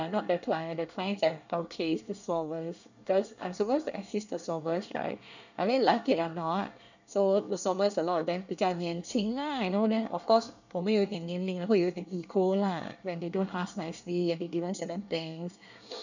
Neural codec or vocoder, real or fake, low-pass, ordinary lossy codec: codec, 24 kHz, 1 kbps, SNAC; fake; 7.2 kHz; none